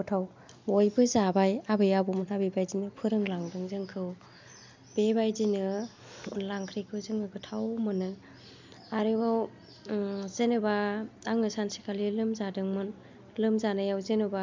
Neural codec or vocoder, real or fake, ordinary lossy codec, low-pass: none; real; MP3, 64 kbps; 7.2 kHz